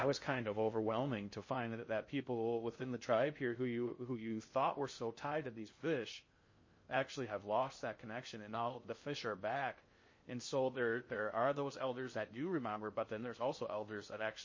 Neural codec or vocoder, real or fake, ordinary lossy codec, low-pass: codec, 16 kHz in and 24 kHz out, 0.6 kbps, FocalCodec, streaming, 2048 codes; fake; MP3, 32 kbps; 7.2 kHz